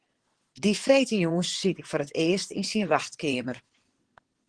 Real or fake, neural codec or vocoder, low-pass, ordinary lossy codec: fake; vocoder, 22.05 kHz, 80 mel bands, WaveNeXt; 9.9 kHz; Opus, 16 kbps